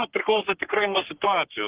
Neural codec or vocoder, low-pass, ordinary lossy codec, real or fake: codec, 44.1 kHz, 3.4 kbps, Pupu-Codec; 3.6 kHz; Opus, 16 kbps; fake